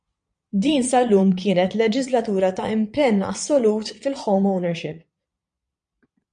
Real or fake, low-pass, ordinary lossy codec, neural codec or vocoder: fake; 9.9 kHz; MP3, 64 kbps; vocoder, 22.05 kHz, 80 mel bands, Vocos